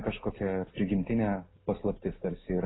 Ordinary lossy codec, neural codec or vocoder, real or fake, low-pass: AAC, 16 kbps; none; real; 7.2 kHz